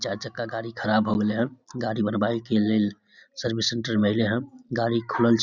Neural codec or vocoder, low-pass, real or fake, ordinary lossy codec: none; 7.2 kHz; real; none